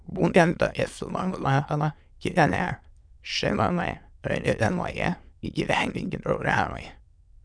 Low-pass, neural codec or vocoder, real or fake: 9.9 kHz; autoencoder, 22.05 kHz, a latent of 192 numbers a frame, VITS, trained on many speakers; fake